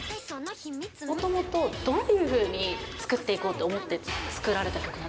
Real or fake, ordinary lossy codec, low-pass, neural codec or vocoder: real; none; none; none